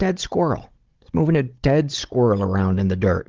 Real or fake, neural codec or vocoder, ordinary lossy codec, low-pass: fake; codec, 16 kHz, 16 kbps, FunCodec, trained on Chinese and English, 50 frames a second; Opus, 16 kbps; 7.2 kHz